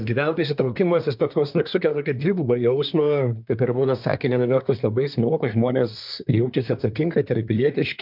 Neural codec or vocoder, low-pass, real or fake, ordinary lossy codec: codec, 24 kHz, 1 kbps, SNAC; 5.4 kHz; fake; MP3, 48 kbps